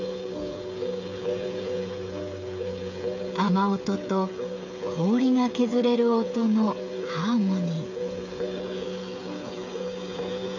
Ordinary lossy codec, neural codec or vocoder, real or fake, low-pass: none; codec, 16 kHz, 8 kbps, FreqCodec, smaller model; fake; 7.2 kHz